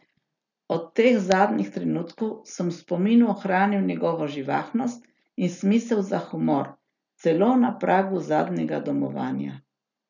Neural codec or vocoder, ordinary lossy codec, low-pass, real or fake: none; none; 7.2 kHz; real